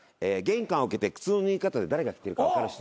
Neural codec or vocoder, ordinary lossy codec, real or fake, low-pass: none; none; real; none